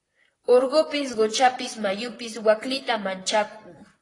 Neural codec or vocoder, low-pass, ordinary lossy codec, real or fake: vocoder, 44.1 kHz, 128 mel bands, Pupu-Vocoder; 10.8 kHz; AAC, 32 kbps; fake